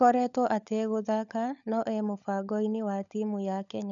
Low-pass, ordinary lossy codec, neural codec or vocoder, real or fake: 7.2 kHz; none; codec, 16 kHz, 16 kbps, FunCodec, trained on LibriTTS, 50 frames a second; fake